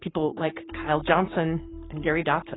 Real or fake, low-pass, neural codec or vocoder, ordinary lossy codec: fake; 7.2 kHz; codec, 44.1 kHz, 7.8 kbps, Pupu-Codec; AAC, 16 kbps